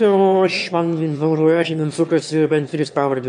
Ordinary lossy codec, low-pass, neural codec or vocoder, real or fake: MP3, 64 kbps; 9.9 kHz; autoencoder, 22.05 kHz, a latent of 192 numbers a frame, VITS, trained on one speaker; fake